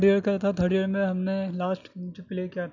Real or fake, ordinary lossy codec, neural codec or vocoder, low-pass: real; none; none; 7.2 kHz